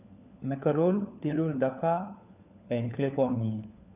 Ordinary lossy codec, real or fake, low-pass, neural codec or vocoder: none; fake; 3.6 kHz; codec, 16 kHz, 4 kbps, FunCodec, trained on LibriTTS, 50 frames a second